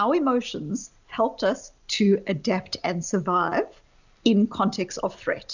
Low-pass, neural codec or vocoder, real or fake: 7.2 kHz; none; real